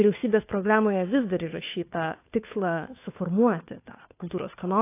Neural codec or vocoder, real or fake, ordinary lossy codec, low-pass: codec, 16 kHz, 2 kbps, FunCodec, trained on Chinese and English, 25 frames a second; fake; AAC, 24 kbps; 3.6 kHz